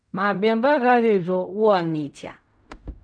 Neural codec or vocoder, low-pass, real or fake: codec, 16 kHz in and 24 kHz out, 0.4 kbps, LongCat-Audio-Codec, fine tuned four codebook decoder; 9.9 kHz; fake